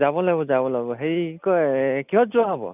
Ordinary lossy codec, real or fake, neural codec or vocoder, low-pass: none; real; none; 3.6 kHz